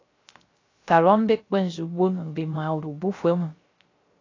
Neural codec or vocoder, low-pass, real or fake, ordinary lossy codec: codec, 16 kHz, 0.3 kbps, FocalCodec; 7.2 kHz; fake; AAC, 32 kbps